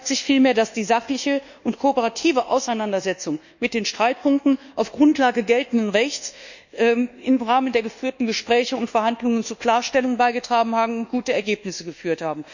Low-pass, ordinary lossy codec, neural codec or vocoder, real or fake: 7.2 kHz; none; codec, 24 kHz, 1.2 kbps, DualCodec; fake